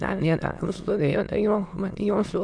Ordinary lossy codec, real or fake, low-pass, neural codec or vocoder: MP3, 64 kbps; fake; 9.9 kHz; autoencoder, 22.05 kHz, a latent of 192 numbers a frame, VITS, trained on many speakers